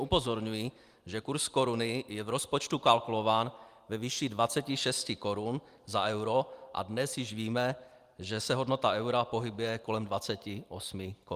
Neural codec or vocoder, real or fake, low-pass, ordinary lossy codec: none; real; 14.4 kHz; Opus, 32 kbps